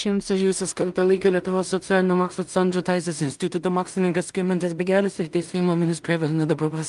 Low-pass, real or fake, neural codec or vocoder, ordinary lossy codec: 10.8 kHz; fake; codec, 16 kHz in and 24 kHz out, 0.4 kbps, LongCat-Audio-Codec, two codebook decoder; Opus, 32 kbps